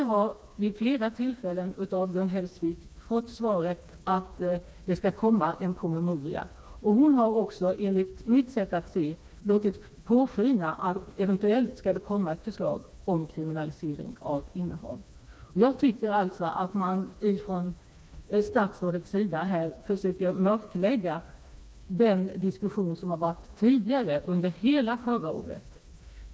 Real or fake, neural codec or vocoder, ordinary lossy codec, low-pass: fake; codec, 16 kHz, 2 kbps, FreqCodec, smaller model; none; none